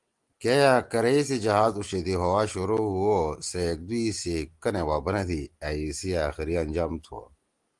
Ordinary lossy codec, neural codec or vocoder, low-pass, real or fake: Opus, 24 kbps; none; 10.8 kHz; real